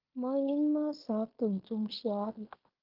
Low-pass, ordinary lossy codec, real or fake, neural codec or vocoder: 5.4 kHz; Opus, 24 kbps; fake; codec, 16 kHz in and 24 kHz out, 0.9 kbps, LongCat-Audio-Codec, fine tuned four codebook decoder